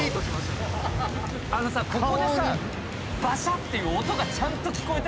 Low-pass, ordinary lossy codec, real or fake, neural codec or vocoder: none; none; real; none